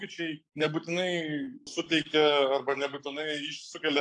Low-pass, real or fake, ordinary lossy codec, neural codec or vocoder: 10.8 kHz; fake; AAC, 64 kbps; codec, 44.1 kHz, 7.8 kbps, Pupu-Codec